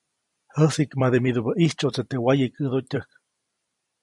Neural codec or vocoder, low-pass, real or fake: vocoder, 44.1 kHz, 128 mel bands every 256 samples, BigVGAN v2; 10.8 kHz; fake